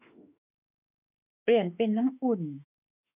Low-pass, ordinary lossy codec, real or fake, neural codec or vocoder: 3.6 kHz; none; fake; autoencoder, 48 kHz, 32 numbers a frame, DAC-VAE, trained on Japanese speech